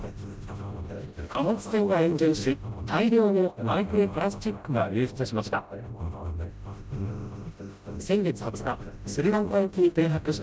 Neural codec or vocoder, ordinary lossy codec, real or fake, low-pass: codec, 16 kHz, 0.5 kbps, FreqCodec, smaller model; none; fake; none